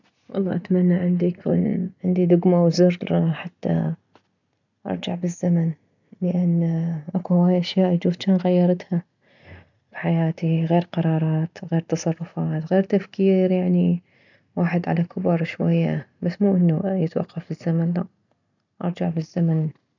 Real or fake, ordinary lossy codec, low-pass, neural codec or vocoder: real; none; 7.2 kHz; none